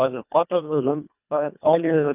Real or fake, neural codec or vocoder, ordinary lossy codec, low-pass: fake; codec, 24 kHz, 1.5 kbps, HILCodec; none; 3.6 kHz